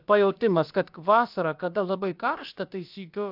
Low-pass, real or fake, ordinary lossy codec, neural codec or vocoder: 5.4 kHz; fake; AAC, 48 kbps; codec, 16 kHz, about 1 kbps, DyCAST, with the encoder's durations